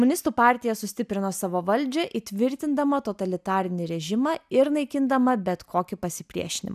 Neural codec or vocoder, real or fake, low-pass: none; real; 14.4 kHz